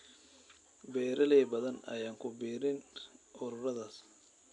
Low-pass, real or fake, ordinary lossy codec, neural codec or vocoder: 10.8 kHz; real; AAC, 64 kbps; none